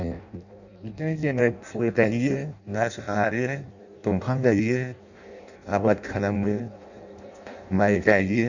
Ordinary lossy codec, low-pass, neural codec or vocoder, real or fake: none; 7.2 kHz; codec, 16 kHz in and 24 kHz out, 0.6 kbps, FireRedTTS-2 codec; fake